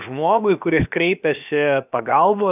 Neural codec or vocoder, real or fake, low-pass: codec, 16 kHz, about 1 kbps, DyCAST, with the encoder's durations; fake; 3.6 kHz